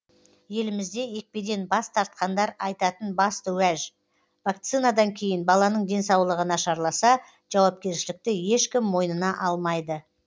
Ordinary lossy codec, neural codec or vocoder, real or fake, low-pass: none; none; real; none